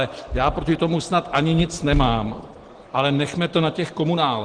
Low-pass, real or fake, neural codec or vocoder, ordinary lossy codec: 9.9 kHz; real; none; Opus, 16 kbps